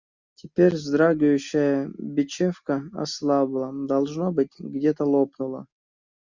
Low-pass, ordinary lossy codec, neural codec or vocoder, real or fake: 7.2 kHz; Opus, 64 kbps; none; real